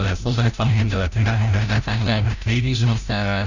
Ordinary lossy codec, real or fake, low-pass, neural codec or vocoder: none; fake; 7.2 kHz; codec, 16 kHz, 0.5 kbps, FreqCodec, larger model